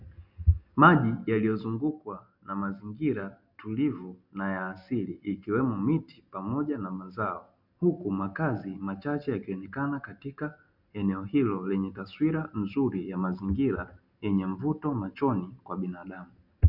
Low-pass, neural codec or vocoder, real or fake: 5.4 kHz; none; real